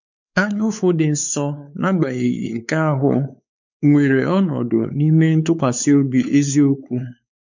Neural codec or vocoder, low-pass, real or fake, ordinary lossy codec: codec, 16 kHz, 4 kbps, X-Codec, WavLM features, trained on Multilingual LibriSpeech; 7.2 kHz; fake; none